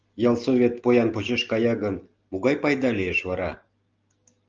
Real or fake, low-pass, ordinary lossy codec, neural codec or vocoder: real; 7.2 kHz; Opus, 24 kbps; none